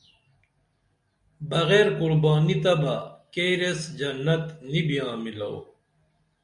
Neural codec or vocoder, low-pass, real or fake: none; 10.8 kHz; real